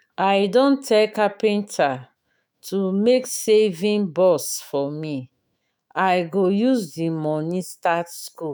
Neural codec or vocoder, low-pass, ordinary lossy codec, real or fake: autoencoder, 48 kHz, 128 numbers a frame, DAC-VAE, trained on Japanese speech; none; none; fake